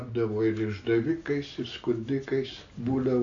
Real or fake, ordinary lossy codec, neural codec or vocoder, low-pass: real; AAC, 48 kbps; none; 7.2 kHz